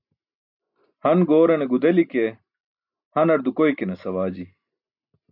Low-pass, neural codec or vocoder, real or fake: 5.4 kHz; none; real